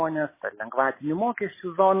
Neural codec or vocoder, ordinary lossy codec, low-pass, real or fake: none; MP3, 16 kbps; 3.6 kHz; real